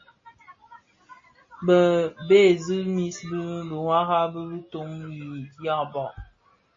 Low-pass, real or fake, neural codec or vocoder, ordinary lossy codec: 7.2 kHz; real; none; MP3, 32 kbps